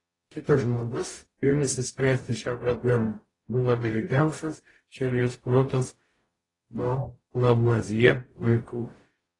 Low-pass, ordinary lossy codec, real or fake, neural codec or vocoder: 10.8 kHz; AAC, 32 kbps; fake; codec, 44.1 kHz, 0.9 kbps, DAC